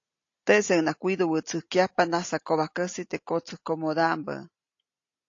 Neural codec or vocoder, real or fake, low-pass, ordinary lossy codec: none; real; 7.2 kHz; AAC, 48 kbps